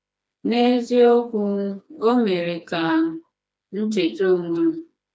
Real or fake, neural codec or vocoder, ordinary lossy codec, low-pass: fake; codec, 16 kHz, 2 kbps, FreqCodec, smaller model; none; none